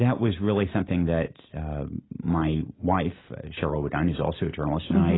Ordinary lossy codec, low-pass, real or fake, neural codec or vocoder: AAC, 16 kbps; 7.2 kHz; real; none